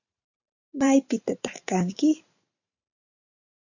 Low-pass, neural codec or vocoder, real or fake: 7.2 kHz; vocoder, 44.1 kHz, 128 mel bands every 512 samples, BigVGAN v2; fake